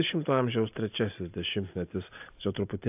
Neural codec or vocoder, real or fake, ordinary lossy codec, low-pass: none; real; AAC, 32 kbps; 3.6 kHz